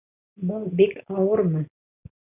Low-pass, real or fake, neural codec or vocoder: 3.6 kHz; real; none